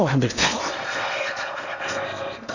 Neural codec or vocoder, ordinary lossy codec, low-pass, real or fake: codec, 16 kHz in and 24 kHz out, 0.8 kbps, FocalCodec, streaming, 65536 codes; none; 7.2 kHz; fake